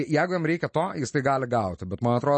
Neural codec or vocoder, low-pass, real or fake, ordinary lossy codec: none; 10.8 kHz; real; MP3, 32 kbps